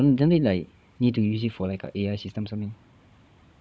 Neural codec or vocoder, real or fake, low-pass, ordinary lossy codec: codec, 16 kHz, 16 kbps, FunCodec, trained on Chinese and English, 50 frames a second; fake; none; none